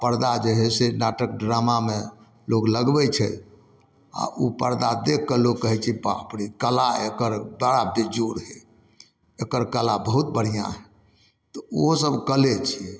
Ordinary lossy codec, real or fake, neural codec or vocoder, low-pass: none; real; none; none